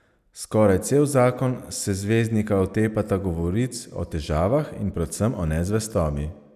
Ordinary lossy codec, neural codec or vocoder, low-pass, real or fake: none; none; 14.4 kHz; real